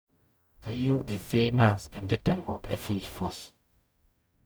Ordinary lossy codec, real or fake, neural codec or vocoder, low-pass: none; fake; codec, 44.1 kHz, 0.9 kbps, DAC; none